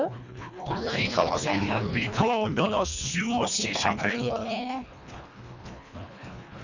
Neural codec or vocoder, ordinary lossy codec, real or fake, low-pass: codec, 24 kHz, 1.5 kbps, HILCodec; none; fake; 7.2 kHz